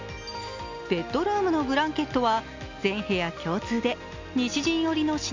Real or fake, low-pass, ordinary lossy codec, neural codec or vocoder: real; 7.2 kHz; MP3, 64 kbps; none